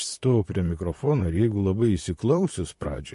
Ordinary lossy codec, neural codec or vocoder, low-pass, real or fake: MP3, 48 kbps; vocoder, 44.1 kHz, 128 mel bands, Pupu-Vocoder; 14.4 kHz; fake